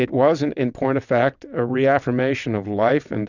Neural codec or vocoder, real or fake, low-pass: vocoder, 22.05 kHz, 80 mel bands, WaveNeXt; fake; 7.2 kHz